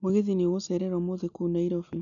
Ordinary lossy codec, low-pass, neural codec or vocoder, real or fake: none; 7.2 kHz; none; real